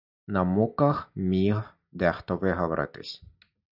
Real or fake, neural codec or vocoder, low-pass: real; none; 5.4 kHz